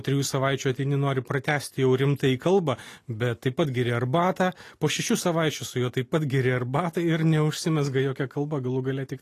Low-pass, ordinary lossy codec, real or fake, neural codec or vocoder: 14.4 kHz; AAC, 48 kbps; real; none